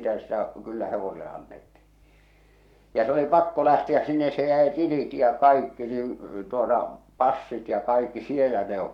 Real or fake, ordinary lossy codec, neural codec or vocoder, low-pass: fake; none; codec, 44.1 kHz, 7.8 kbps, Pupu-Codec; 19.8 kHz